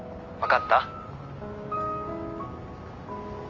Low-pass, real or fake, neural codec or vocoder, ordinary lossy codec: 7.2 kHz; real; none; Opus, 32 kbps